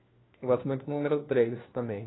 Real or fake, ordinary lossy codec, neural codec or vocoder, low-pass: fake; AAC, 16 kbps; codec, 16 kHz, 1 kbps, FunCodec, trained on LibriTTS, 50 frames a second; 7.2 kHz